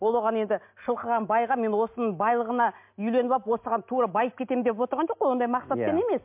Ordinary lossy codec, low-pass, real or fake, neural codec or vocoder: MP3, 32 kbps; 3.6 kHz; real; none